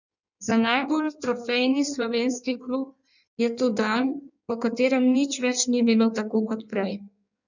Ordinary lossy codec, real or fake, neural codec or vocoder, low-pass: none; fake; codec, 16 kHz in and 24 kHz out, 1.1 kbps, FireRedTTS-2 codec; 7.2 kHz